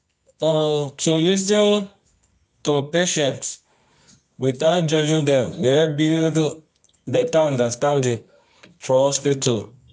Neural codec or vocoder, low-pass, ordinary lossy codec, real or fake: codec, 24 kHz, 0.9 kbps, WavTokenizer, medium music audio release; 10.8 kHz; none; fake